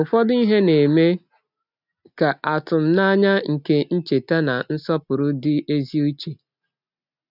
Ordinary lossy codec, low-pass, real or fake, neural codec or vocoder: none; 5.4 kHz; real; none